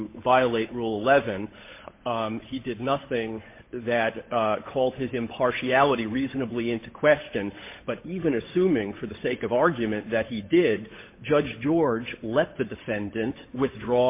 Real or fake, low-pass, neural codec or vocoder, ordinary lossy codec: fake; 3.6 kHz; codec, 16 kHz, 8 kbps, FunCodec, trained on Chinese and English, 25 frames a second; MP3, 24 kbps